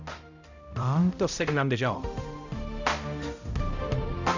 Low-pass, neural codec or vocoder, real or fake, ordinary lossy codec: 7.2 kHz; codec, 16 kHz, 0.5 kbps, X-Codec, HuBERT features, trained on balanced general audio; fake; none